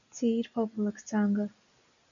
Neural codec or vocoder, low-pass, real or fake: none; 7.2 kHz; real